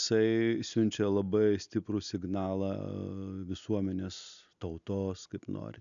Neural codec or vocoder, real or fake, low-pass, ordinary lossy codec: none; real; 7.2 kHz; MP3, 96 kbps